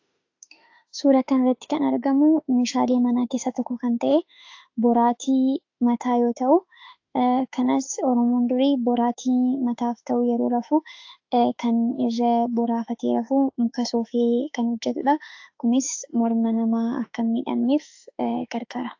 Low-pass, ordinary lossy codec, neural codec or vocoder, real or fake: 7.2 kHz; AAC, 48 kbps; autoencoder, 48 kHz, 32 numbers a frame, DAC-VAE, trained on Japanese speech; fake